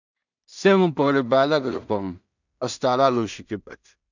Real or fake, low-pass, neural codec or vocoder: fake; 7.2 kHz; codec, 16 kHz in and 24 kHz out, 0.4 kbps, LongCat-Audio-Codec, two codebook decoder